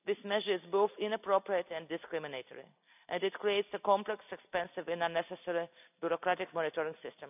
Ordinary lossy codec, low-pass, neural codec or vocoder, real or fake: none; 3.6 kHz; none; real